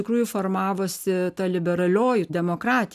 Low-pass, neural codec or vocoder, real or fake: 14.4 kHz; none; real